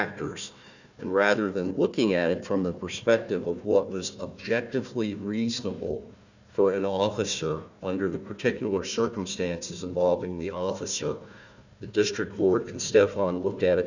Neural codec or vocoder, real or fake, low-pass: codec, 16 kHz, 1 kbps, FunCodec, trained on Chinese and English, 50 frames a second; fake; 7.2 kHz